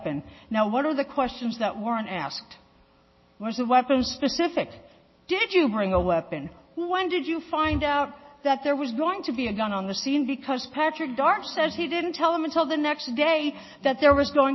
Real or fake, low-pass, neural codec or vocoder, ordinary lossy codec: real; 7.2 kHz; none; MP3, 24 kbps